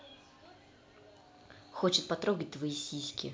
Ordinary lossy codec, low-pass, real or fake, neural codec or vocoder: none; none; real; none